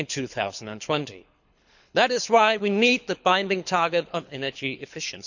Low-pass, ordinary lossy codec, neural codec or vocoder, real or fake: 7.2 kHz; none; codec, 24 kHz, 6 kbps, HILCodec; fake